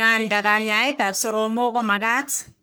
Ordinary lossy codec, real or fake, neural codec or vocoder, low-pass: none; fake; codec, 44.1 kHz, 1.7 kbps, Pupu-Codec; none